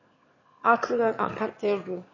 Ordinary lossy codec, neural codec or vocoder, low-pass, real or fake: MP3, 32 kbps; autoencoder, 22.05 kHz, a latent of 192 numbers a frame, VITS, trained on one speaker; 7.2 kHz; fake